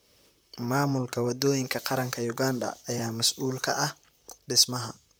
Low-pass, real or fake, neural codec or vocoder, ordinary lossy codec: none; fake; vocoder, 44.1 kHz, 128 mel bands, Pupu-Vocoder; none